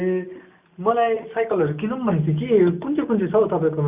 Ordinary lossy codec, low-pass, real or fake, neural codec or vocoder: Opus, 64 kbps; 3.6 kHz; real; none